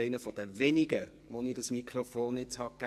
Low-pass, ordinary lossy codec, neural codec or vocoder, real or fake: 14.4 kHz; MP3, 64 kbps; codec, 44.1 kHz, 2.6 kbps, SNAC; fake